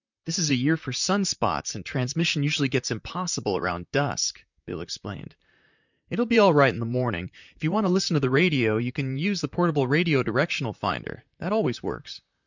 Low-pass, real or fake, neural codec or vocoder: 7.2 kHz; fake; vocoder, 44.1 kHz, 128 mel bands, Pupu-Vocoder